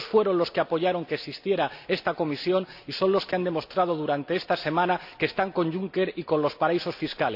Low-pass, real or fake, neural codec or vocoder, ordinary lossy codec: 5.4 kHz; real; none; AAC, 48 kbps